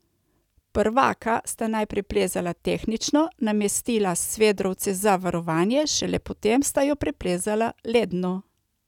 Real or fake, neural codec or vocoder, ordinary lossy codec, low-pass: real; none; none; 19.8 kHz